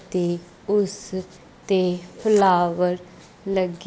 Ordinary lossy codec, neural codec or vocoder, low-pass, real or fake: none; none; none; real